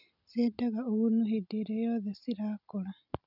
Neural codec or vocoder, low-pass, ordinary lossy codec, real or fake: none; 5.4 kHz; none; real